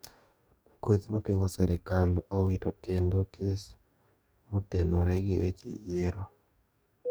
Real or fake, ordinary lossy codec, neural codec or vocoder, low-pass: fake; none; codec, 44.1 kHz, 2.6 kbps, DAC; none